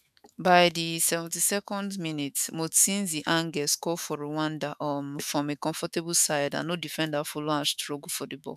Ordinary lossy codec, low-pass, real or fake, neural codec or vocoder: none; 14.4 kHz; fake; autoencoder, 48 kHz, 128 numbers a frame, DAC-VAE, trained on Japanese speech